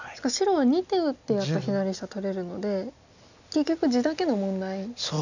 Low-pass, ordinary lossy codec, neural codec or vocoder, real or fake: 7.2 kHz; none; none; real